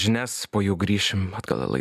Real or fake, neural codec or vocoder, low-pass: real; none; 14.4 kHz